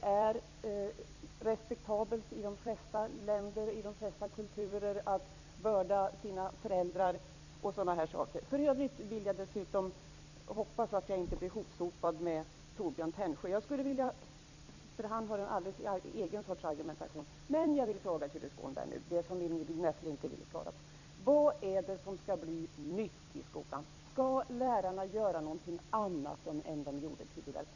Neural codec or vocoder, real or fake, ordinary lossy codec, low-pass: vocoder, 44.1 kHz, 128 mel bands every 256 samples, BigVGAN v2; fake; none; 7.2 kHz